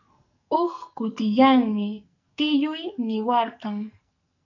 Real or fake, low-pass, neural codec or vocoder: fake; 7.2 kHz; codec, 44.1 kHz, 2.6 kbps, SNAC